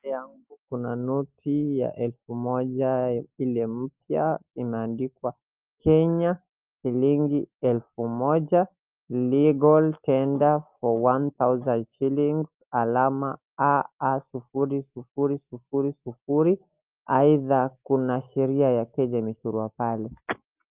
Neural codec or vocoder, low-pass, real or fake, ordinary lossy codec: none; 3.6 kHz; real; Opus, 32 kbps